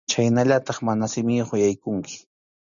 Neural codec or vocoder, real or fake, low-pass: none; real; 7.2 kHz